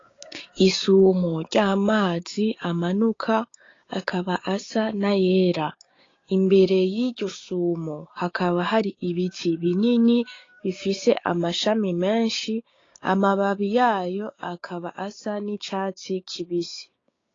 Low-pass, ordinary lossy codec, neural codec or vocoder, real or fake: 7.2 kHz; AAC, 32 kbps; codec, 16 kHz, 6 kbps, DAC; fake